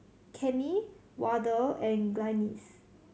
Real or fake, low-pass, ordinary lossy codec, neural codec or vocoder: real; none; none; none